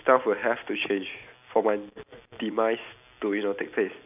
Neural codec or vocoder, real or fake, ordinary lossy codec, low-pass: none; real; none; 3.6 kHz